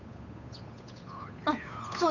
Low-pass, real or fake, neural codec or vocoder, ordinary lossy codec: 7.2 kHz; fake; codec, 16 kHz, 8 kbps, FunCodec, trained on Chinese and English, 25 frames a second; none